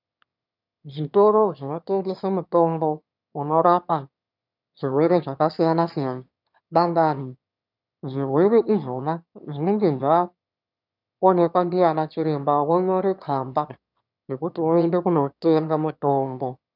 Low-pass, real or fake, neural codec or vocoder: 5.4 kHz; fake; autoencoder, 22.05 kHz, a latent of 192 numbers a frame, VITS, trained on one speaker